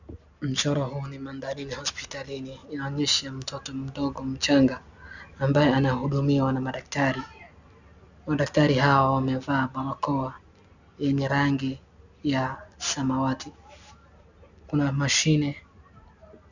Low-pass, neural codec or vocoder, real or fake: 7.2 kHz; none; real